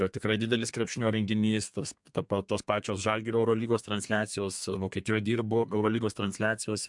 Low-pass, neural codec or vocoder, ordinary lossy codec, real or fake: 10.8 kHz; codec, 32 kHz, 1.9 kbps, SNAC; MP3, 64 kbps; fake